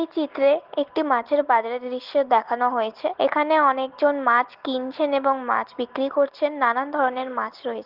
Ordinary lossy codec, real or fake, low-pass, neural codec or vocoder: Opus, 16 kbps; real; 5.4 kHz; none